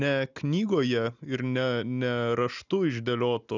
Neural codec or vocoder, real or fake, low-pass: none; real; 7.2 kHz